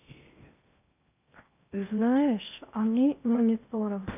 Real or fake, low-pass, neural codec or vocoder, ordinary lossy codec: fake; 3.6 kHz; codec, 16 kHz in and 24 kHz out, 0.8 kbps, FocalCodec, streaming, 65536 codes; none